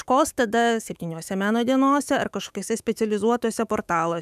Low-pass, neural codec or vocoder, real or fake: 14.4 kHz; autoencoder, 48 kHz, 128 numbers a frame, DAC-VAE, trained on Japanese speech; fake